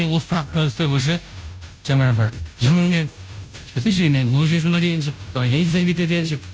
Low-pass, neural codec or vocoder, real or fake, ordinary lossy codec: none; codec, 16 kHz, 0.5 kbps, FunCodec, trained on Chinese and English, 25 frames a second; fake; none